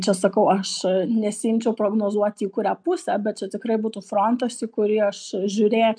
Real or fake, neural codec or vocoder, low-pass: real; none; 9.9 kHz